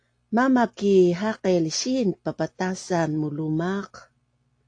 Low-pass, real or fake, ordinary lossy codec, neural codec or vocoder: 9.9 kHz; real; AAC, 48 kbps; none